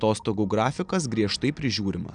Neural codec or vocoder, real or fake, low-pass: none; real; 9.9 kHz